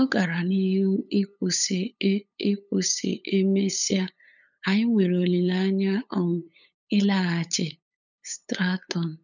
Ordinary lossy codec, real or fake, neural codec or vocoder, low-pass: none; fake; codec, 16 kHz, 8 kbps, FunCodec, trained on LibriTTS, 25 frames a second; 7.2 kHz